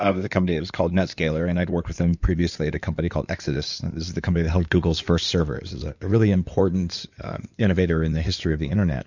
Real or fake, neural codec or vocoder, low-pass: fake; codec, 16 kHz in and 24 kHz out, 2.2 kbps, FireRedTTS-2 codec; 7.2 kHz